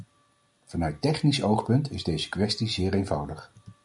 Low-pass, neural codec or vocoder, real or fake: 10.8 kHz; none; real